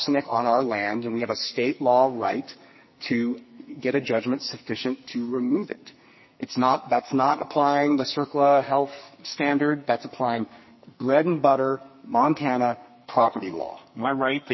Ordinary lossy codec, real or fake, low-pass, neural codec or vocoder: MP3, 24 kbps; fake; 7.2 kHz; codec, 44.1 kHz, 2.6 kbps, SNAC